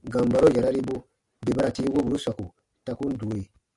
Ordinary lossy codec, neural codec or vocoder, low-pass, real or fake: AAC, 64 kbps; none; 10.8 kHz; real